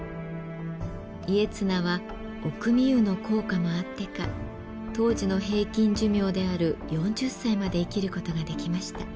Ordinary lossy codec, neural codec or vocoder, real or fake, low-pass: none; none; real; none